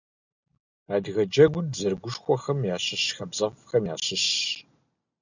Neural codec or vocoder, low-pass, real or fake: none; 7.2 kHz; real